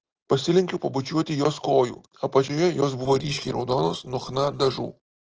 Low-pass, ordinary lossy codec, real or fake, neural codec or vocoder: 7.2 kHz; Opus, 16 kbps; real; none